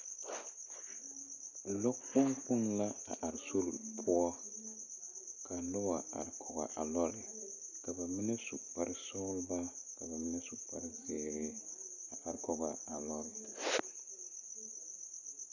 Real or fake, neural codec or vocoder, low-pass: real; none; 7.2 kHz